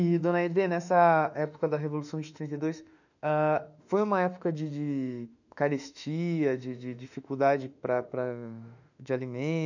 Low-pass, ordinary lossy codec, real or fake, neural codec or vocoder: 7.2 kHz; none; fake; autoencoder, 48 kHz, 32 numbers a frame, DAC-VAE, trained on Japanese speech